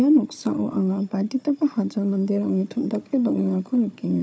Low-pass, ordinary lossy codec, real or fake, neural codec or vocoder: none; none; fake; codec, 16 kHz, 4 kbps, FunCodec, trained on Chinese and English, 50 frames a second